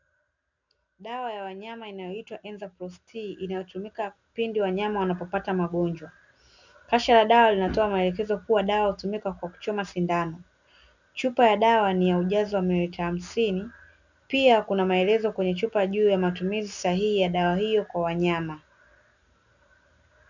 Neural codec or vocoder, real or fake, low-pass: none; real; 7.2 kHz